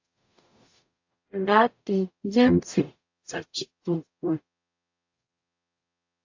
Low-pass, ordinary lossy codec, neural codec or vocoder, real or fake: 7.2 kHz; AAC, 48 kbps; codec, 44.1 kHz, 0.9 kbps, DAC; fake